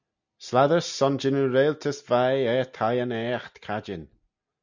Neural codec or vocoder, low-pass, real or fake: none; 7.2 kHz; real